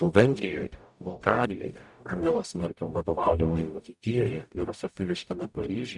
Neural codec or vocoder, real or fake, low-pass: codec, 44.1 kHz, 0.9 kbps, DAC; fake; 10.8 kHz